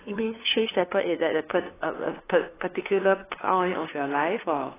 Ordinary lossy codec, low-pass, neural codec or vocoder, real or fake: AAC, 16 kbps; 3.6 kHz; codec, 16 kHz, 2 kbps, FunCodec, trained on LibriTTS, 25 frames a second; fake